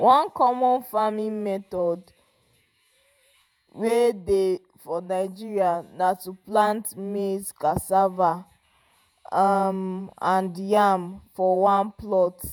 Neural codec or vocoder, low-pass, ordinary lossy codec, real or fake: vocoder, 48 kHz, 128 mel bands, Vocos; none; none; fake